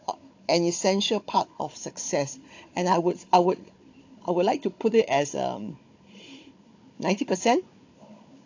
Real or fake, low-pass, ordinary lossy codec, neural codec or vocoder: fake; 7.2 kHz; AAC, 48 kbps; codec, 16 kHz, 16 kbps, FunCodec, trained on Chinese and English, 50 frames a second